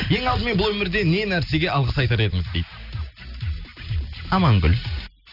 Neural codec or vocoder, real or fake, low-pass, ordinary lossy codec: none; real; 5.4 kHz; none